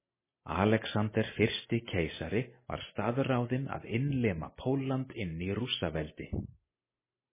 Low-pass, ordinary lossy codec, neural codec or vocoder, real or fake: 3.6 kHz; MP3, 16 kbps; none; real